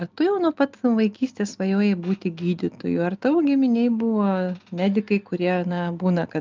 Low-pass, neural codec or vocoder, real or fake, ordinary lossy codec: 7.2 kHz; none; real; Opus, 24 kbps